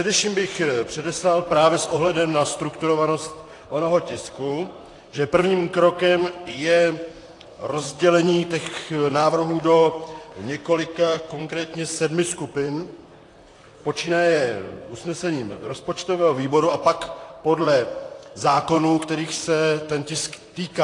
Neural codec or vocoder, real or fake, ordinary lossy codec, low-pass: vocoder, 44.1 kHz, 128 mel bands, Pupu-Vocoder; fake; AAC, 48 kbps; 10.8 kHz